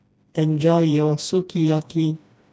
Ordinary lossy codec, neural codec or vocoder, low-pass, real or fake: none; codec, 16 kHz, 1 kbps, FreqCodec, smaller model; none; fake